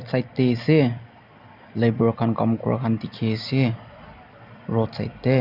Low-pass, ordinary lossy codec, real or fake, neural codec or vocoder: 5.4 kHz; none; real; none